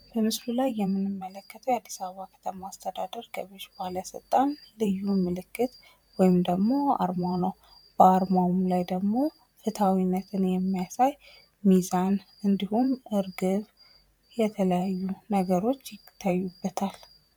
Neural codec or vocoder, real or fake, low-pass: vocoder, 44.1 kHz, 128 mel bands every 512 samples, BigVGAN v2; fake; 19.8 kHz